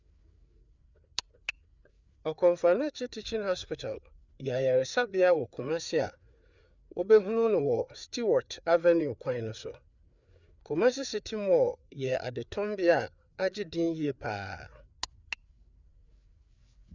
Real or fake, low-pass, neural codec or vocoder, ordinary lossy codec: fake; 7.2 kHz; codec, 16 kHz, 4 kbps, FreqCodec, larger model; Opus, 64 kbps